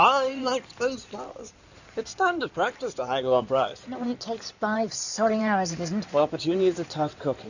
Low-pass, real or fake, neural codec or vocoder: 7.2 kHz; fake; codec, 16 kHz in and 24 kHz out, 2.2 kbps, FireRedTTS-2 codec